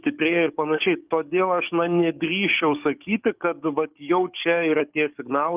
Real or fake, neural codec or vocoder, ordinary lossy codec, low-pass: fake; codec, 44.1 kHz, 7.8 kbps, DAC; Opus, 32 kbps; 3.6 kHz